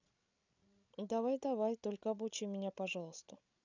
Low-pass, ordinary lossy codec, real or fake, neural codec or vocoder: 7.2 kHz; none; fake; codec, 16 kHz, 16 kbps, FreqCodec, larger model